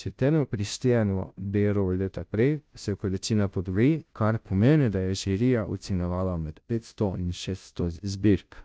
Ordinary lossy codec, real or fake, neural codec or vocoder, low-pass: none; fake; codec, 16 kHz, 0.5 kbps, FunCodec, trained on Chinese and English, 25 frames a second; none